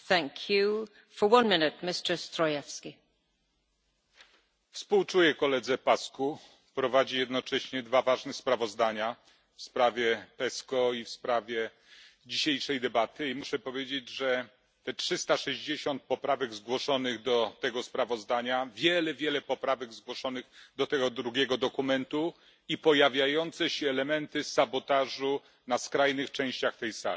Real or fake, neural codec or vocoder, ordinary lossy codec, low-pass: real; none; none; none